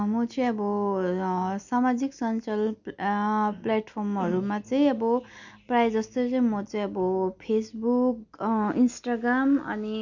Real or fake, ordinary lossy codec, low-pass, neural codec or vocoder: real; none; 7.2 kHz; none